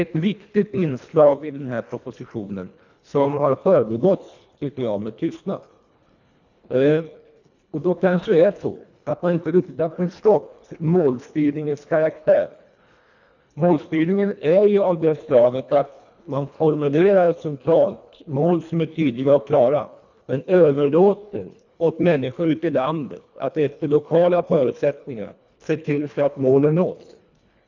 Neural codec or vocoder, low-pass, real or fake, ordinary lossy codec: codec, 24 kHz, 1.5 kbps, HILCodec; 7.2 kHz; fake; none